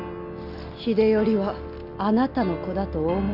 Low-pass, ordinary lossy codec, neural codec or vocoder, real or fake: 5.4 kHz; none; none; real